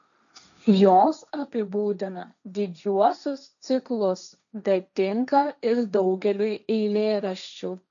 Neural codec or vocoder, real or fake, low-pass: codec, 16 kHz, 1.1 kbps, Voila-Tokenizer; fake; 7.2 kHz